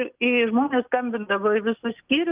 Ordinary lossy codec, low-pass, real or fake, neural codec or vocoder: Opus, 24 kbps; 3.6 kHz; real; none